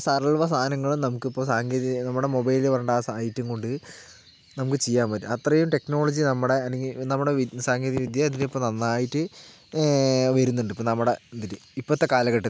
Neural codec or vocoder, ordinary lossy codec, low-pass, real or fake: none; none; none; real